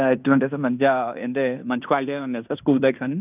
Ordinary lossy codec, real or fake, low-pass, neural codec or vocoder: none; fake; 3.6 kHz; codec, 16 kHz in and 24 kHz out, 0.9 kbps, LongCat-Audio-Codec, fine tuned four codebook decoder